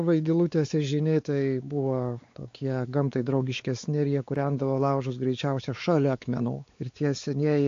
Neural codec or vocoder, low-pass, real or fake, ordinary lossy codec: codec, 16 kHz, 4 kbps, X-Codec, WavLM features, trained on Multilingual LibriSpeech; 7.2 kHz; fake; AAC, 48 kbps